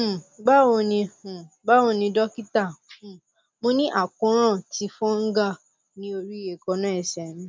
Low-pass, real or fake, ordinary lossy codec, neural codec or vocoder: none; real; none; none